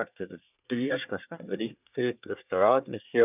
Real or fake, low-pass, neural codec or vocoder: fake; 3.6 kHz; codec, 24 kHz, 1 kbps, SNAC